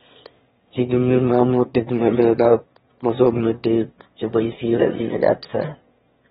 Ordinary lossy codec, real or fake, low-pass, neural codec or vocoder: AAC, 16 kbps; fake; 9.9 kHz; autoencoder, 22.05 kHz, a latent of 192 numbers a frame, VITS, trained on one speaker